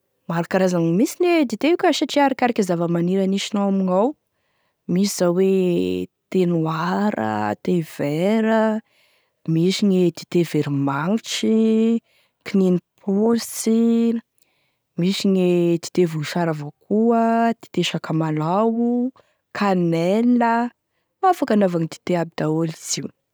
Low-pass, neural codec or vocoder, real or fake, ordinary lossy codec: none; none; real; none